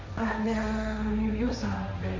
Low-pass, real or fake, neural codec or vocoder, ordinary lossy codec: 7.2 kHz; fake; codec, 16 kHz, 1.1 kbps, Voila-Tokenizer; MP3, 48 kbps